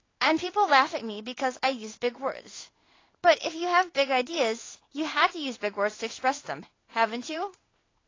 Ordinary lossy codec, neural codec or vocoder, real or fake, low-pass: AAC, 32 kbps; codec, 16 kHz in and 24 kHz out, 1 kbps, XY-Tokenizer; fake; 7.2 kHz